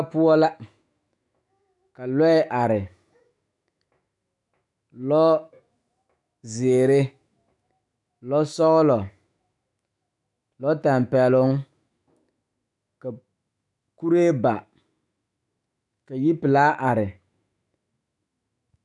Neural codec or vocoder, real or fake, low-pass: autoencoder, 48 kHz, 128 numbers a frame, DAC-VAE, trained on Japanese speech; fake; 10.8 kHz